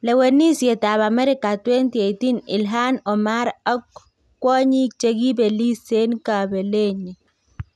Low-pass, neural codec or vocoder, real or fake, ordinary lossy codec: none; none; real; none